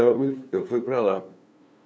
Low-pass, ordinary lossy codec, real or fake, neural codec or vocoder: none; none; fake; codec, 16 kHz, 2 kbps, FunCodec, trained on LibriTTS, 25 frames a second